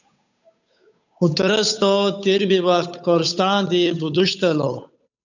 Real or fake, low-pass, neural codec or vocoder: fake; 7.2 kHz; codec, 16 kHz, 8 kbps, FunCodec, trained on Chinese and English, 25 frames a second